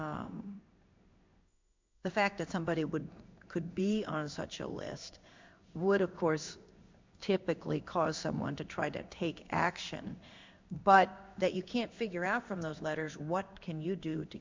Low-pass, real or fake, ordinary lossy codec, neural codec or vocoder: 7.2 kHz; fake; MP3, 64 kbps; codec, 16 kHz in and 24 kHz out, 1 kbps, XY-Tokenizer